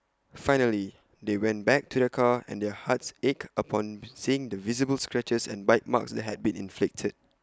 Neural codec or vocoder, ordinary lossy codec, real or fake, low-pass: none; none; real; none